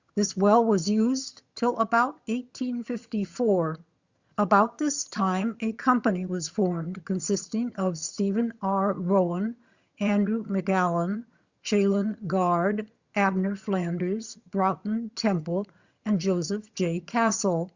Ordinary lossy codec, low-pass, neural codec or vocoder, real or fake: Opus, 64 kbps; 7.2 kHz; vocoder, 22.05 kHz, 80 mel bands, HiFi-GAN; fake